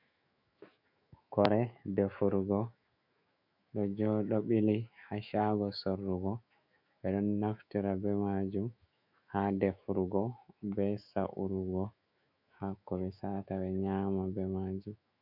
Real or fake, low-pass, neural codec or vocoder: fake; 5.4 kHz; autoencoder, 48 kHz, 128 numbers a frame, DAC-VAE, trained on Japanese speech